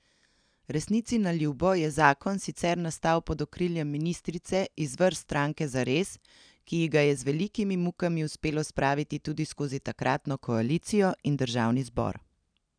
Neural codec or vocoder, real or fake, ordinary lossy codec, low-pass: none; real; none; 9.9 kHz